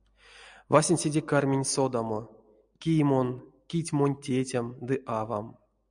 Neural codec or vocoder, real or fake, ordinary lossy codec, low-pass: none; real; MP3, 96 kbps; 9.9 kHz